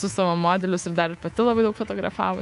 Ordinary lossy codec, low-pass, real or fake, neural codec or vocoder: AAC, 96 kbps; 10.8 kHz; fake; codec, 24 kHz, 3.1 kbps, DualCodec